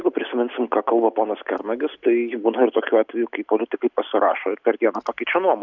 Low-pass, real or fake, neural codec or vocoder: 7.2 kHz; real; none